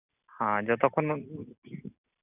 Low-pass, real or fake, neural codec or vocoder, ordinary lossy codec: 3.6 kHz; real; none; none